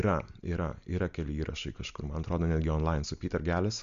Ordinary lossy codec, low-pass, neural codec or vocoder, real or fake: Opus, 64 kbps; 7.2 kHz; none; real